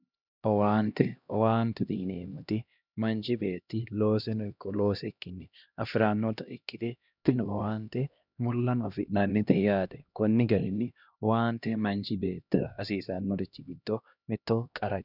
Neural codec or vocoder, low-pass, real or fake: codec, 16 kHz, 1 kbps, X-Codec, HuBERT features, trained on LibriSpeech; 5.4 kHz; fake